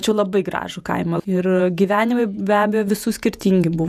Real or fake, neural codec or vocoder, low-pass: fake; vocoder, 48 kHz, 128 mel bands, Vocos; 14.4 kHz